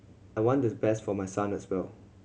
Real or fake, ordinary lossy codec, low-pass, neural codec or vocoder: real; none; none; none